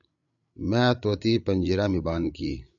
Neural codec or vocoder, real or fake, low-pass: codec, 16 kHz, 8 kbps, FreqCodec, larger model; fake; 7.2 kHz